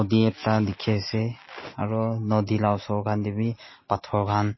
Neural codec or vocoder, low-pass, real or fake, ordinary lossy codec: none; 7.2 kHz; real; MP3, 24 kbps